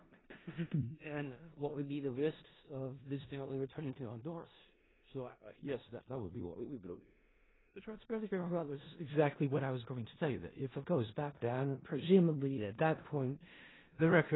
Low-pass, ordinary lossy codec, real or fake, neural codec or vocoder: 7.2 kHz; AAC, 16 kbps; fake; codec, 16 kHz in and 24 kHz out, 0.4 kbps, LongCat-Audio-Codec, four codebook decoder